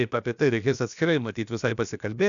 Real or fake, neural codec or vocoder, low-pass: fake; codec, 16 kHz, about 1 kbps, DyCAST, with the encoder's durations; 7.2 kHz